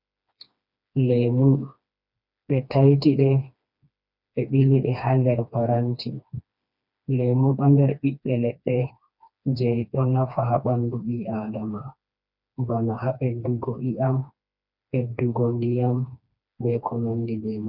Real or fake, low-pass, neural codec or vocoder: fake; 5.4 kHz; codec, 16 kHz, 2 kbps, FreqCodec, smaller model